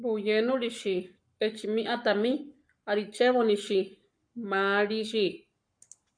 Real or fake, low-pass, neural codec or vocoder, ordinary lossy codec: fake; 9.9 kHz; codec, 44.1 kHz, 7.8 kbps, Pupu-Codec; MP3, 64 kbps